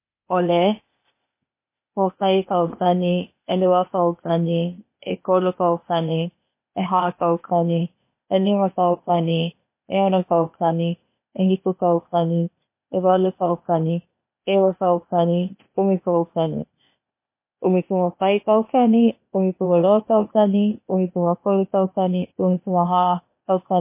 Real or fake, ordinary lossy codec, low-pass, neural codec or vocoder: fake; MP3, 24 kbps; 3.6 kHz; codec, 16 kHz, 0.8 kbps, ZipCodec